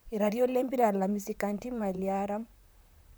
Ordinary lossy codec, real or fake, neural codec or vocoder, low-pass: none; fake; vocoder, 44.1 kHz, 128 mel bands, Pupu-Vocoder; none